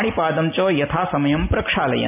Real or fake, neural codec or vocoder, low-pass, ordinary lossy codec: real; none; 3.6 kHz; AAC, 32 kbps